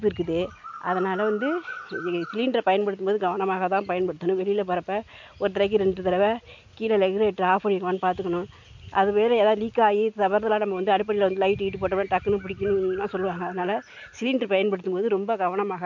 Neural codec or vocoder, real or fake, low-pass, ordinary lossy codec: none; real; 7.2 kHz; MP3, 64 kbps